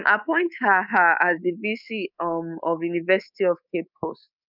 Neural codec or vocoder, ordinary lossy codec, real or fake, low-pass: codec, 24 kHz, 3.1 kbps, DualCodec; none; fake; 5.4 kHz